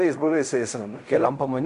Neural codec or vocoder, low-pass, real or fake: codec, 16 kHz in and 24 kHz out, 0.4 kbps, LongCat-Audio-Codec, fine tuned four codebook decoder; 10.8 kHz; fake